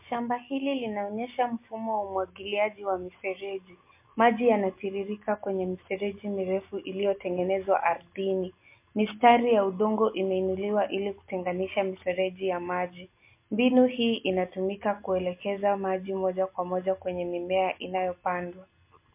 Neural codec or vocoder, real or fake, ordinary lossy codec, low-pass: none; real; MP3, 24 kbps; 3.6 kHz